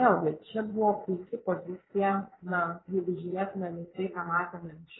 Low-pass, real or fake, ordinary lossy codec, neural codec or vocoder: 7.2 kHz; fake; AAC, 16 kbps; vocoder, 22.05 kHz, 80 mel bands, Vocos